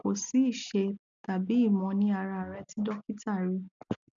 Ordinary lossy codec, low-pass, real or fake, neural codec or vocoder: none; 7.2 kHz; real; none